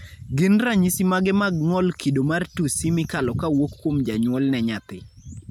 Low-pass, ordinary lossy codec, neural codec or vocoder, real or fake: 19.8 kHz; none; none; real